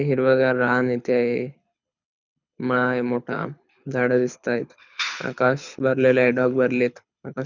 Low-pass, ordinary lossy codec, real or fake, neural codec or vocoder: 7.2 kHz; none; fake; codec, 24 kHz, 6 kbps, HILCodec